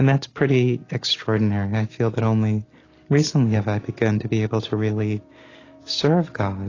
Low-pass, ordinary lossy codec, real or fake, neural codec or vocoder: 7.2 kHz; AAC, 32 kbps; real; none